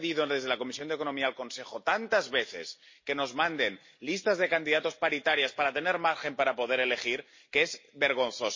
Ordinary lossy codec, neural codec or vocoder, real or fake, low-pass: MP3, 32 kbps; none; real; 7.2 kHz